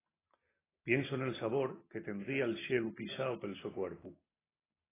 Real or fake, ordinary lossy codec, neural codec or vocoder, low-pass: real; AAC, 16 kbps; none; 3.6 kHz